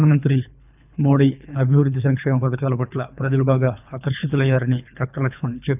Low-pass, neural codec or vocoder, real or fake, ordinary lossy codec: 3.6 kHz; codec, 24 kHz, 3 kbps, HILCodec; fake; none